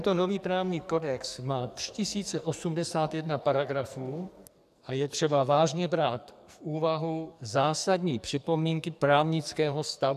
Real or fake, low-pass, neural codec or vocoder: fake; 14.4 kHz; codec, 44.1 kHz, 2.6 kbps, SNAC